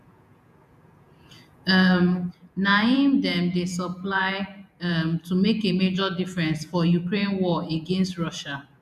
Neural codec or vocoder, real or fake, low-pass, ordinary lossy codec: vocoder, 48 kHz, 128 mel bands, Vocos; fake; 14.4 kHz; MP3, 96 kbps